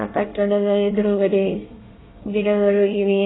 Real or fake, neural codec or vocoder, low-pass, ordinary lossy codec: fake; codec, 24 kHz, 1 kbps, SNAC; 7.2 kHz; AAC, 16 kbps